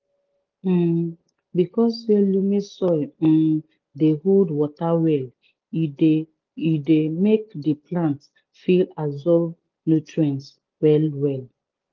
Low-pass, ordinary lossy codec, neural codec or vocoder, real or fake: 7.2 kHz; Opus, 32 kbps; none; real